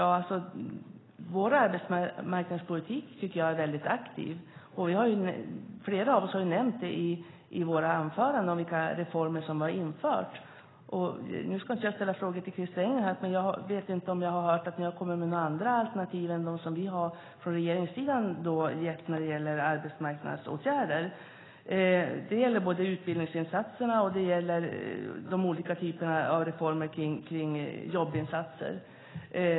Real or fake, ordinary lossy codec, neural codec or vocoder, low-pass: real; AAC, 16 kbps; none; 7.2 kHz